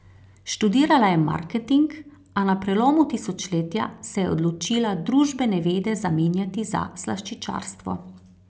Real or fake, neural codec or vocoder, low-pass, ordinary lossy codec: real; none; none; none